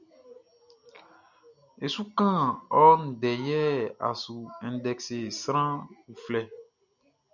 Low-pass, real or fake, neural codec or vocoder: 7.2 kHz; real; none